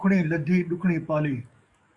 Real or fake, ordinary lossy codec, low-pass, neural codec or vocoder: fake; Opus, 64 kbps; 10.8 kHz; codec, 44.1 kHz, 7.8 kbps, DAC